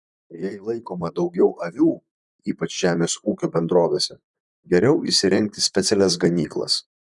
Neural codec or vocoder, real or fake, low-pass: vocoder, 44.1 kHz, 128 mel bands, Pupu-Vocoder; fake; 10.8 kHz